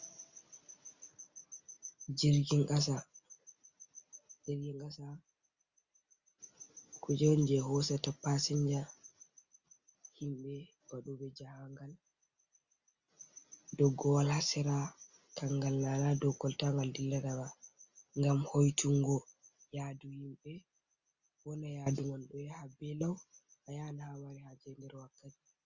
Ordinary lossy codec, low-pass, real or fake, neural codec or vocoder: Opus, 64 kbps; 7.2 kHz; real; none